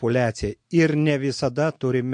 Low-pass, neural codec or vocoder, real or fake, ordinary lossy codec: 9.9 kHz; none; real; MP3, 48 kbps